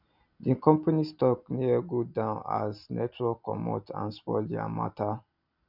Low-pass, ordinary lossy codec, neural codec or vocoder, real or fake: 5.4 kHz; none; none; real